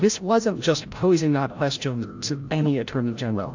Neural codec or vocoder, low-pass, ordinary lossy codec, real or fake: codec, 16 kHz, 0.5 kbps, FreqCodec, larger model; 7.2 kHz; AAC, 48 kbps; fake